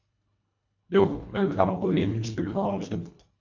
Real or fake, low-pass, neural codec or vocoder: fake; 7.2 kHz; codec, 24 kHz, 1.5 kbps, HILCodec